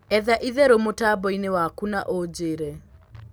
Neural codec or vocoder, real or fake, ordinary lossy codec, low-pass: none; real; none; none